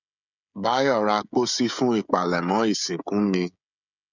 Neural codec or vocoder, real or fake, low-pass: codec, 16 kHz, 16 kbps, FreqCodec, smaller model; fake; 7.2 kHz